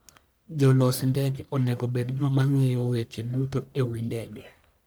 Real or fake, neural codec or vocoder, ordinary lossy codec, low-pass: fake; codec, 44.1 kHz, 1.7 kbps, Pupu-Codec; none; none